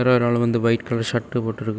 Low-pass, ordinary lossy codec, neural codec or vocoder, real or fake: none; none; none; real